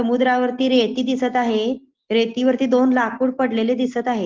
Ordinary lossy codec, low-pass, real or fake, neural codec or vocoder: Opus, 16 kbps; 7.2 kHz; real; none